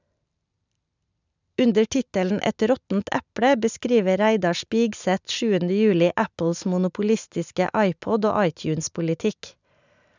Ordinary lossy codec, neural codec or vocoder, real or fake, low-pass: none; none; real; 7.2 kHz